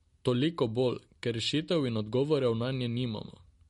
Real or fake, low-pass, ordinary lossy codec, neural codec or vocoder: real; 19.8 kHz; MP3, 48 kbps; none